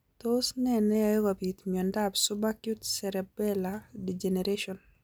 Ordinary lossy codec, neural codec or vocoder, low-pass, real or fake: none; none; none; real